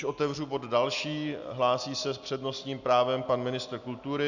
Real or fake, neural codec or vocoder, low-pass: real; none; 7.2 kHz